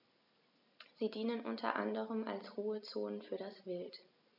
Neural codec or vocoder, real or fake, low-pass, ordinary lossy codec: none; real; 5.4 kHz; none